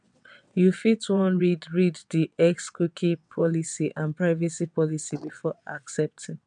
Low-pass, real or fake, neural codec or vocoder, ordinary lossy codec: 9.9 kHz; fake; vocoder, 22.05 kHz, 80 mel bands, WaveNeXt; none